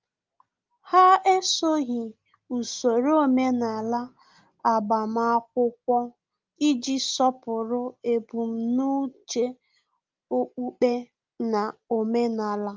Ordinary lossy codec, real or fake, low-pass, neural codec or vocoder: Opus, 32 kbps; real; 7.2 kHz; none